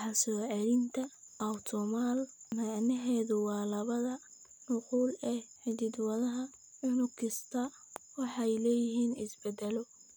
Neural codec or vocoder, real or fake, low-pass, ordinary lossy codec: none; real; none; none